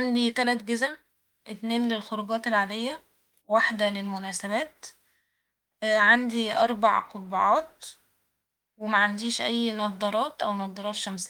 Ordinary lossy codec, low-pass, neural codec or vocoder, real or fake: Opus, 32 kbps; 19.8 kHz; autoencoder, 48 kHz, 32 numbers a frame, DAC-VAE, trained on Japanese speech; fake